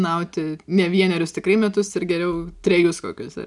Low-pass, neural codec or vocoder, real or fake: 10.8 kHz; none; real